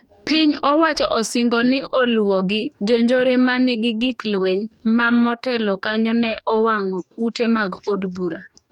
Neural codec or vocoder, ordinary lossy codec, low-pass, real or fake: codec, 44.1 kHz, 2.6 kbps, DAC; none; 19.8 kHz; fake